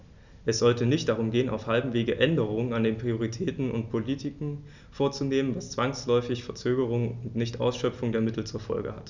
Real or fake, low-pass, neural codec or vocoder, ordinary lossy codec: real; 7.2 kHz; none; none